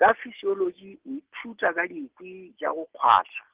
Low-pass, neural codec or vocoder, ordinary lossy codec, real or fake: 3.6 kHz; none; Opus, 16 kbps; real